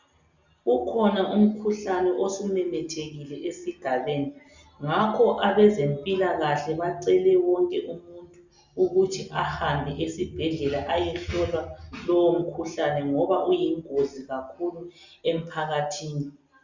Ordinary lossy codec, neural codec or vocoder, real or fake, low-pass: Opus, 64 kbps; none; real; 7.2 kHz